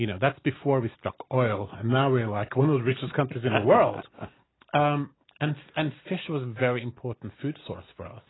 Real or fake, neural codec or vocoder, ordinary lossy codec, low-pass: real; none; AAC, 16 kbps; 7.2 kHz